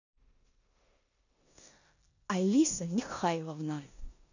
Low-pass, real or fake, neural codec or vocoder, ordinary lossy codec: 7.2 kHz; fake; codec, 16 kHz in and 24 kHz out, 0.9 kbps, LongCat-Audio-Codec, four codebook decoder; none